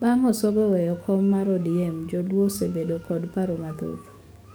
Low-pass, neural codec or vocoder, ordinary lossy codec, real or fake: none; codec, 44.1 kHz, 7.8 kbps, DAC; none; fake